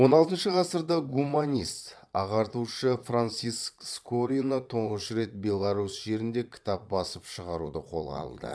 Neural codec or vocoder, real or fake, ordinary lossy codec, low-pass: vocoder, 22.05 kHz, 80 mel bands, Vocos; fake; none; none